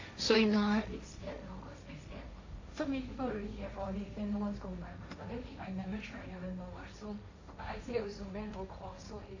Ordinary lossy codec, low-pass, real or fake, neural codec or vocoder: AAC, 48 kbps; 7.2 kHz; fake; codec, 16 kHz, 1.1 kbps, Voila-Tokenizer